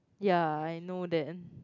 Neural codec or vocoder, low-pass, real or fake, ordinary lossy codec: none; 7.2 kHz; real; none